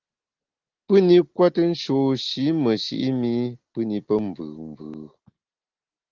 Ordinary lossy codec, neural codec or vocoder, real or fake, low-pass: Opus, 16 kbps; none; real; 7.2 kHz